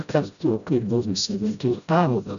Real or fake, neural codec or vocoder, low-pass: fake; codec, 16 kHz, 0.5 kbps, FreqCodec, smaller model; 7.2 kHz